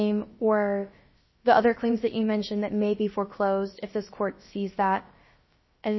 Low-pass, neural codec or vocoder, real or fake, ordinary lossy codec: 7.2 kHz; codec, 16 kHz, about 1 kbps, DyCAST, with the encoder's durations; fake; MP3, 24 kbps